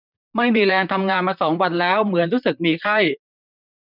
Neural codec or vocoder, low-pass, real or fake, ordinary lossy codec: vocoder, 44.1 kHz, 128 mel bands, Pupu-Vocoder; 5.4 kHz; fake; none